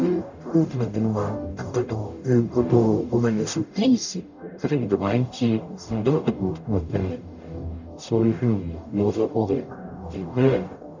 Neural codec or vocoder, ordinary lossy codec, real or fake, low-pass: codec, 44.1 kHz, 0.9 kbps, DAC; none; fake; 7.2 kHz